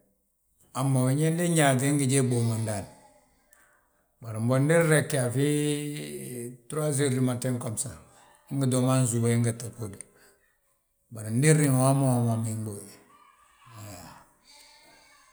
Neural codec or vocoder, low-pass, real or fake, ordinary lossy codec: none; none; real; none